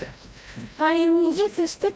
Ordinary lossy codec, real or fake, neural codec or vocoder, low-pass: none; fake; codec, 16 kHz, 0.5 kbps, FreqCodec, larger model; none